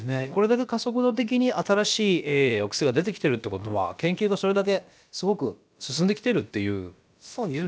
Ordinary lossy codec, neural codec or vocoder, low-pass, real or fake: none; codec, 16 kHz, about 1 kbps, DyCAST, with the encoder's durations; none; fake